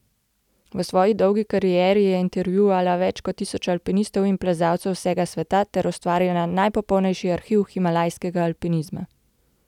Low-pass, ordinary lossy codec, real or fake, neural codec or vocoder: 19.8 kHz; none; real; none